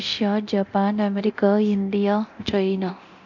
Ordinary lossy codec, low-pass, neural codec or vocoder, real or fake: none; 7.2 kHz; codec, 24 kHz, 0.9 kbps, DualCodec; fake